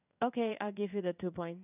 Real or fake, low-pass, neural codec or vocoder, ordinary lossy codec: fake; 3.6 kHz; codec, 16 kHz in and 24 kHz out, 1 kbps, XY-Tokenizer; none